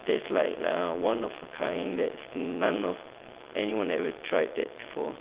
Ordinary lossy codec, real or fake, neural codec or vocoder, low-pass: Opus, 16 kbps; fake; vocoder, 22.05 kHz, 80 mel bands, WaveNeXt; 3.6 kHz